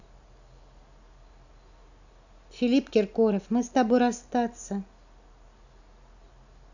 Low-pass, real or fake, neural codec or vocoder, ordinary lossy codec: 7.2 kHz; real; none; none